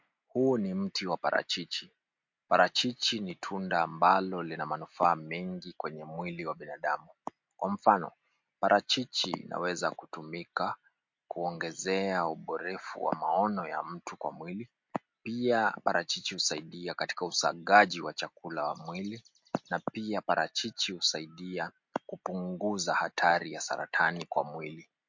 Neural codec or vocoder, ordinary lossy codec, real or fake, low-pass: none; MP3, 48 kbps; real; 7.2 kHz